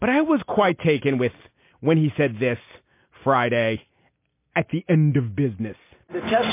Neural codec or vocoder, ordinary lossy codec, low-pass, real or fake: none; MP3, 24 kbps; 3.6 kHz; real